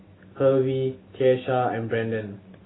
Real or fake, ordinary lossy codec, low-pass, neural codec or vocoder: real; AAC, 16 kbps; 7.2 kHz; none